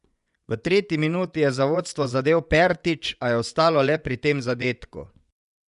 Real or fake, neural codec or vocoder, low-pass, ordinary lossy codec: fake; vocoder, 24 kHz, 100 mel bands, Vocos; 10.8 kHz; none